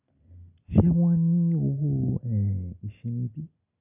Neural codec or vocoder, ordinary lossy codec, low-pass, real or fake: none; none; 3.6 kHz; real